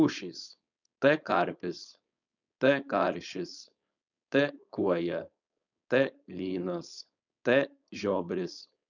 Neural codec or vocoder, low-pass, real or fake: codec, 16 kHz, 4.8 kbps, FACodec; 7.2 kHz; fake